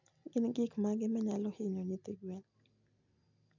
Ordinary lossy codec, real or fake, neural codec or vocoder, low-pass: none; real; none; 7.2 kHz